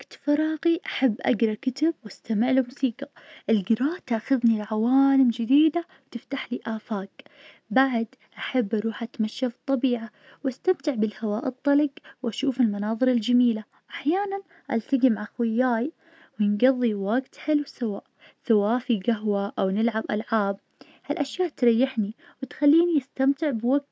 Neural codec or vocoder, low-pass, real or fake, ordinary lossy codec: none; none; real; none